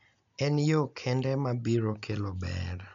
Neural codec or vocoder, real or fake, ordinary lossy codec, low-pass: none; real; MP3, 64 kbps; 7.2 kHz